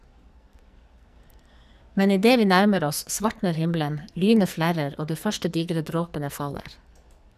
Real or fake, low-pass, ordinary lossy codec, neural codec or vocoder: fake; 14.4 kHz; none; codec, 44.1 kHz, 2.6 kbps, SNAC